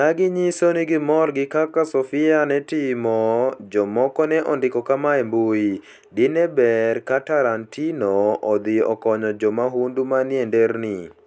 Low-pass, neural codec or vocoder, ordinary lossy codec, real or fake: none; none; none; real